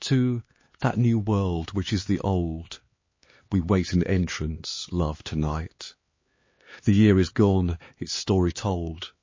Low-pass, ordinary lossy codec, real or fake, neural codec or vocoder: 7.2 kHz; MP3, 32 kbps; fake; codec, 16 kHz, 2 kbps, X-Codec, HuBERT features, trained on LibriSpeech